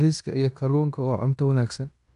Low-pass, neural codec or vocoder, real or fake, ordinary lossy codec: 10.8 kHz; codec, 16 kHz in and 24 kHz out, 0.9 kbps, LongCat-Audio-Codec, fine tuned four codebook decoder; fake; none